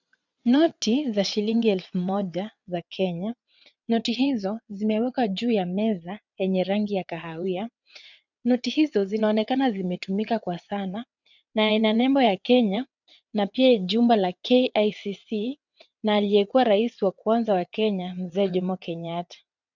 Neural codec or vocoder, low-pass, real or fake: vocoder, 22.05 kHz, 80 mel bands, WaveNeXt; 7.2 kHz; fake